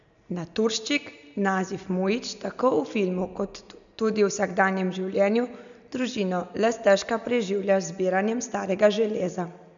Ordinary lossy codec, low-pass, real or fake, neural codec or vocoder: none; 7.2 kHz; real; none